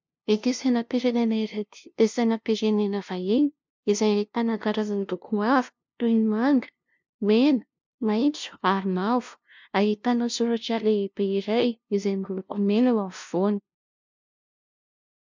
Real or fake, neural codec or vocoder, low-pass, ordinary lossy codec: fake; codec, 16 kHz, 0.5 kbps, FunCodec, trained on LibriTTS, 25 frames a second; 7.2 kHz; MP3, 64 kbps